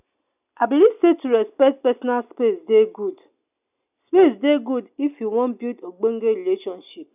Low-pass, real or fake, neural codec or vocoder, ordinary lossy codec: 3.6 kHz; real; none; none